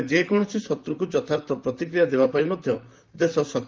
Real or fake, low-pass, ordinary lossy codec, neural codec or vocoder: fake; 7.2 kHz; Opus, 32 kbps; vocoder, 44.1 kHz, 128 mel bands, Pupu-Vocoder